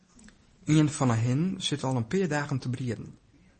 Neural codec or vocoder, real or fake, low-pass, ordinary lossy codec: none; real; 10.8 kHz; MP3, 32 kbps